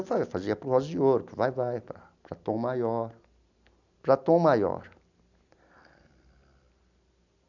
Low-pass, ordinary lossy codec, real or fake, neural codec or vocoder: 7.2 kHz; none; real; none